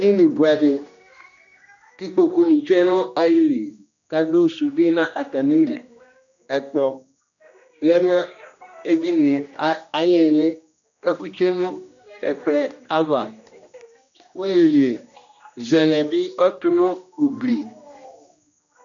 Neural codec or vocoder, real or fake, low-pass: codec, 16 kHz, 1 kbps, X-Codec, HuBERT features, trained on general audio; fake; 7.2 kHz